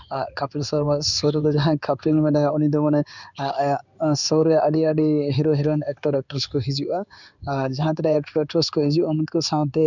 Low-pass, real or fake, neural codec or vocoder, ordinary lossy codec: 7.2 kHz; fake; codec, 16 kHz in and 24 kHz out, 1 kbps, XY-Tokenizer; none